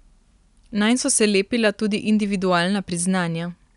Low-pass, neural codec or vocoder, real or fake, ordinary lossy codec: 10.8 kHz; none; real; none